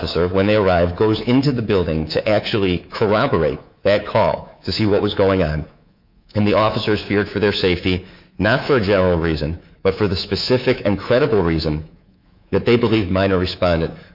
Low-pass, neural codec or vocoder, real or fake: 5.4 kHz; codec, 24 kHz, 3.1 kbps, DualCodec; fake